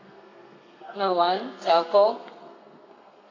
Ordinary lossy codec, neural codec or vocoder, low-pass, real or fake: AAC, 32 kbps; codec, 32 kHz, 1.9 kbps, SNAC; 7.2 kHz; fake